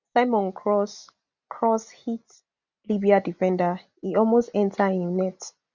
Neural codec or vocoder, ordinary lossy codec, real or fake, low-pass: none; none; real; 7.2 kHz